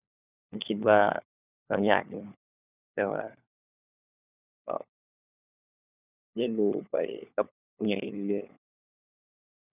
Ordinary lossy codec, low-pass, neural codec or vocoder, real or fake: none; 3.6 kHz; codec, 16 kHz, 4 kbps, FunCodec, trained on LibriTTS, 50 frames a second; fake